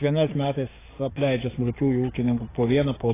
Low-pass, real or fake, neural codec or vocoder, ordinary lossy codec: 3.6 kHz; fake; codec, 16 kHz, 6 kbps, DAC; AAC, 16 kbps